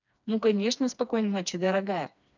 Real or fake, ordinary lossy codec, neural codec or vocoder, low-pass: fake; none; codec, 16 kHz, 2 kbps, FreqCodec, smaller model; 7.2 kHz